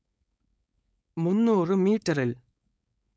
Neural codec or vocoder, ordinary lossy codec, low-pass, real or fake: codec, 16 kHz, 4.8 kbps, FACodec; none; none; fake